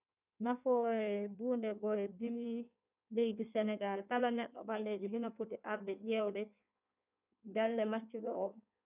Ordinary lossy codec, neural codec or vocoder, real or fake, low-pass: none; codec, 16 kHz in and 24 kHz out, 1.1 kbps, FireRedTTS-2 codec; fake; 3.6 kHz